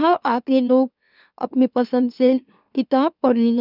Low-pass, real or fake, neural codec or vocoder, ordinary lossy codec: 5.4 kHz; fake; autoencoder, 44.1 kHz, a latent of 192 numbers a frame, MeloTTS; none